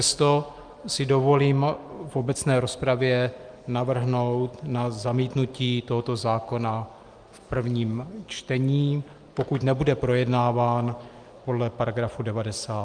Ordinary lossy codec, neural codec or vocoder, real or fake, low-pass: Opus, 32 kbps; none; real; 9.9 kHz